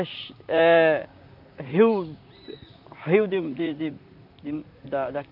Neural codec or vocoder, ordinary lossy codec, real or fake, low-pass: vocoder, 44.1 kHz, 128 mel bands, Pupu-Vocoder; none; fake; 5.4 kHz